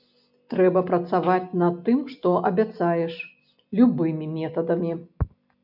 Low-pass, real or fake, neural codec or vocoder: 5.4 kHz; real; none